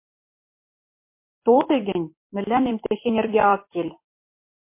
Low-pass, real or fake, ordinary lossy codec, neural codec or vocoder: 3.6 kHz; fake; MP3, 24 kbps; vocoder, 44.1 kHz, 128 mel bands every 256 samples, BigVGAN v2